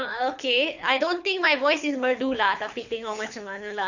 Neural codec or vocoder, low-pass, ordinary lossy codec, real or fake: codec, 24 kHz, 6 kbps, HILCodec; 7.2 kHz; none; fake